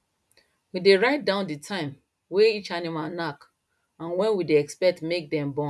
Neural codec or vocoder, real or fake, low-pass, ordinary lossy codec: none; real; none; none